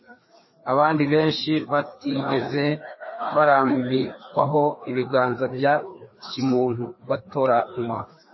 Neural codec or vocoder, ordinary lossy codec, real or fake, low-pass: codec, 16 kHz, 2 kbps, FreqCodec, larger model; MP3, 24 kbps; fake; 7.2 kHz